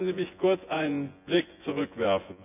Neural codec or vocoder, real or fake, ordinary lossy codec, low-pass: vocoder, 24 kHz, 100 mel bands, Vocos; fake; none; 3.6 kHz